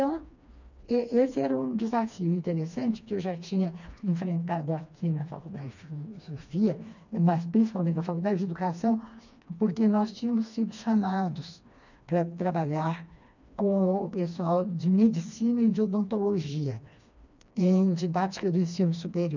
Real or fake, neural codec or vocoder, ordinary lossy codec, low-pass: fake; codec, 16 kHz, 2 kbps, FreqCodec, smaller model; none; 7.2 kHz